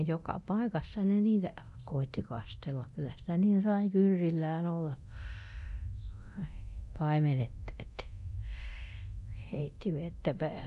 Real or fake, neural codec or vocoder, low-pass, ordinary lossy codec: fake; codec, 24 kHz, 0.9 kbps, DualCodec; 10.8 kHz; none